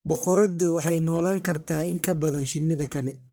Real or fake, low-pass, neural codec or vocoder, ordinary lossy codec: fake; none; codec, 44.1 kHz, 1.7 kbps, Pupu-Codec; none